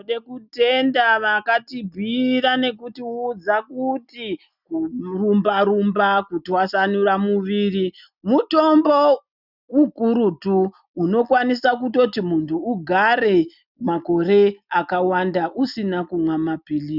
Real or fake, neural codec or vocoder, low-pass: real; none; 5.4 kHz